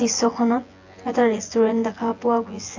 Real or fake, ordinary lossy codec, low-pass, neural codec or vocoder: fake; AAC, 48 kbps; 7.2 kHz; vocoder, 24 kHz, 100 mel bands, Vocos